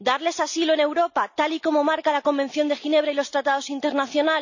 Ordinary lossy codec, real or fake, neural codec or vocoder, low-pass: none; real; none; 7.2 kHz